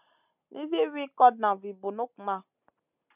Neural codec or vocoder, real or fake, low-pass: none; real; 3.6 kHz